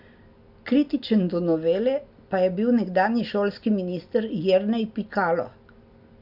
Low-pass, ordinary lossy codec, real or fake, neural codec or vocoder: 5.4 kHz; none; real; none